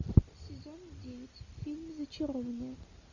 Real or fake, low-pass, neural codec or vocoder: real; 7.2 kHz; none